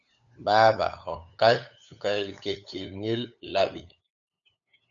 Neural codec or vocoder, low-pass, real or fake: codec, 16 kHz, 8 kbps, FunCodec, trained on LibriTTS, 25 frames a second; 7.2 kHz; fake